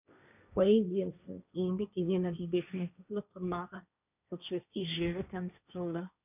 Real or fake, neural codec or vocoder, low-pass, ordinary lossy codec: fake; codec, 16 kHz, 1.1 kbps, Voila-Tokenizer; 3.6 kHz; none